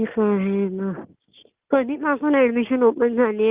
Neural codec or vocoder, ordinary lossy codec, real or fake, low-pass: none; Opus, 24 kbps; real; 3.6 kHz